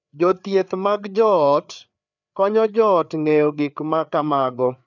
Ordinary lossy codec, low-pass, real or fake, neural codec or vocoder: none; 7.2 kHz; fake; codec, 16 kHz, 4 kbps, FreqCodec, larger model